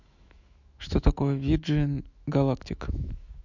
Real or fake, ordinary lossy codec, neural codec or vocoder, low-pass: fake; none; autoencoder, 48 kHz, 128 numbers a frame, DAC-VAE, trained on Japanese speech; 7.2 kHz